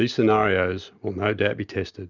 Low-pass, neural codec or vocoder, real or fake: 7.2 kHz; none; real